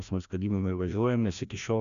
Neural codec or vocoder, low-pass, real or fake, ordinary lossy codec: codec, 16 kHz, 1 kbps, FreqCodec, larger model; 7.2 kHz; fake; AAC, 96 kbps